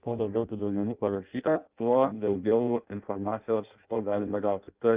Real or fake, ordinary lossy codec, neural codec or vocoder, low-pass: fake; Opus, 32 kbps; codec, 16 kHz in and 24 kHz out, 0.6 kbps, FireRedTTS-2 codec; 3.6 kHz